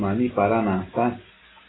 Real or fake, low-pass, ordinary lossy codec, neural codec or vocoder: real; 7.2 kHz; AAC, 16 kbps; none